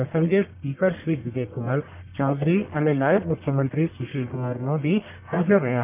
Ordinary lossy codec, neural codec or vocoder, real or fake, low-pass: none; codec, 44.1 kHz, 1.7 kbps, Pupu-Codec; fake; 3.6 kHz